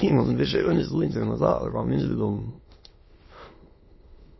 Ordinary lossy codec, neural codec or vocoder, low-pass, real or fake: MP3, 24 kbps; autoencoder, 22.05 kHz, a latent of 192 numbers a frame, VITS, trained on many speakers; 7.2 kHz; fake